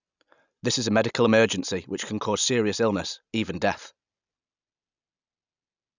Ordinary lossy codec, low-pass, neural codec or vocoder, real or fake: none; 7.2 kHz; none; real